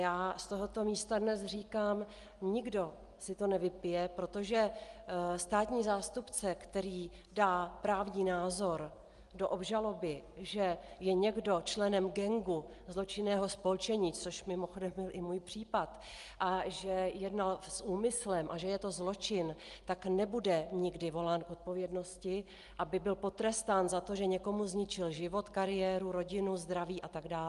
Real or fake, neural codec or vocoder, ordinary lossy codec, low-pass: real; none; Opus, 32 kbps; 10.8 kHz